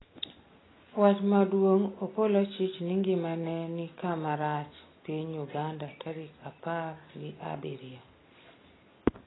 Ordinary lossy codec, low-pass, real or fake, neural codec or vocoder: AAC, 16 kbps; 7.2 kHz; real; none